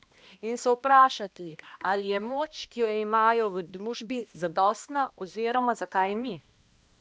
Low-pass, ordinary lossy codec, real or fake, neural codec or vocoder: none; none; fake; codec, 16 kHz, 1 kbps, X-Codec, HuBERT features, trained on balanced general audio